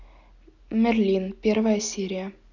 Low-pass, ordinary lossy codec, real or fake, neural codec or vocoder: 7.2 kHz; none; real; none